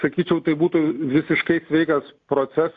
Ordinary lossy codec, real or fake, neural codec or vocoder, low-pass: AAC, 48 kbps; real; none; 7.2 kHz